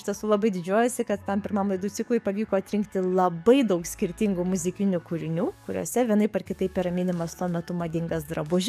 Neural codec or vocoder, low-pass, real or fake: codec, 44.1 kHz, 7.8 kbps, DAC; 14.4 kHz; fake